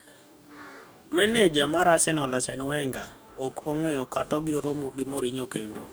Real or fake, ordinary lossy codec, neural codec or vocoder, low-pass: fake; none; codec, 44.1 kHz, 2.6 kbps, DAC; none